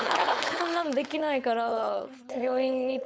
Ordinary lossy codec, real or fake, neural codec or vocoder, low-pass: none; fake; codec, 16 kHz, 4.8 kbps, FACodec; none